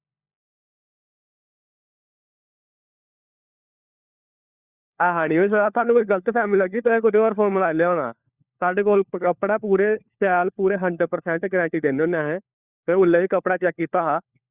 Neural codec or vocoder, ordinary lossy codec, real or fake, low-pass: codec, 16 kHz, 4 kbps, FunCodec, trained on LibriTTS, 50 frames a second; Opus, 64 kbps; fake; 3.6 kHz